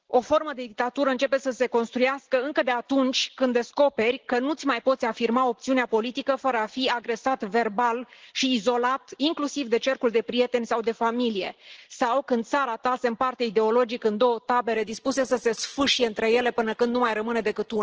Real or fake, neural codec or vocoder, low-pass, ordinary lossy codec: real; none; 7.2 kHz; Opus, 16 kbps